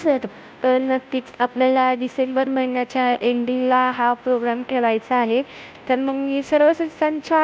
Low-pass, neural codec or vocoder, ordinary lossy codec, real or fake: none; codec, 16 kHz, 0.5 kbps, FunCodec, trained on Chinese and English, 25 frames a second; none; fake